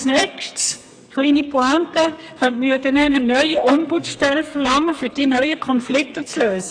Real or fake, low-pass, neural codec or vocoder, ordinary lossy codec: fake; 9.9 kHz; codec, 32 kHz, 1.9 kbps, SNAC; AAC, 64 kbps